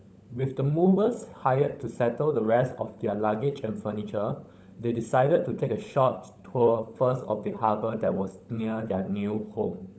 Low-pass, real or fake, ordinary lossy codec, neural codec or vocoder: none; fake; none; codec, 16 kHz, 16 kbps, FunCodec, trained on LibriTTS, 50 frames a second